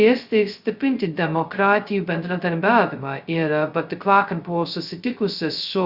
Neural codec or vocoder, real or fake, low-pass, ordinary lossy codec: codec, 16 kHz, 0.2 kbps, FocalCodec; fake; 5.4 kHz; Opus, 64 kbps